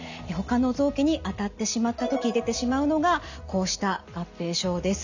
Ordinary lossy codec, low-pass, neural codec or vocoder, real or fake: none; 7.2 kHz; none; real